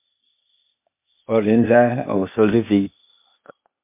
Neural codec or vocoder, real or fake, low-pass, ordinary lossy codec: codec, 16 kHz, 0.8 kbps, ZipCodec; fake; 3.6 kHz; MP3, 32 kbps